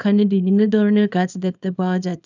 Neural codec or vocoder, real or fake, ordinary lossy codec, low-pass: codec, 24 kHz, 0.9 kbps, WavTokenizer, small release; fake; none; 7.2 kHz